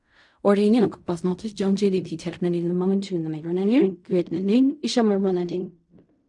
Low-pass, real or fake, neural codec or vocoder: 10.8 kHz; fake; codec, 16 kHz in and 24 kHz out, 0.4 kbps, LongCat-Audio-Codec, fine tuned four codebook decoder